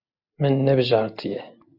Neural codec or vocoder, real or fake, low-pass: none; real; 5.4 kHz